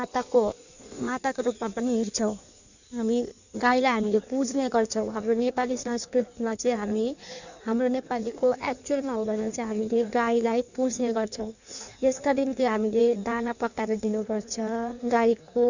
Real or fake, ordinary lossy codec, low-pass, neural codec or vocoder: fake; none; 7.2 kHz; codec, 16 kHz in and 24 kHz out, 1.1 kbps, FireRedTTS-2 codec